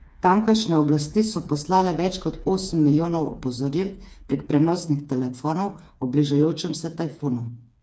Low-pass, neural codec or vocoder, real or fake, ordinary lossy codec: none; codec, 16 kHz, 4 kbps, FreqCodec, smaller model; fake; none